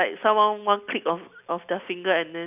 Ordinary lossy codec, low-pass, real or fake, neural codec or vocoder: none; 3.6 kHz; real; none